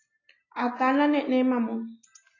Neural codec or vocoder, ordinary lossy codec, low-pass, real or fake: none; AAC, 32 kbps; 7.2 kHz; real